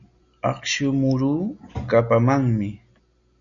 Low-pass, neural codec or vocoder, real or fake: 7.2 kHz; none; real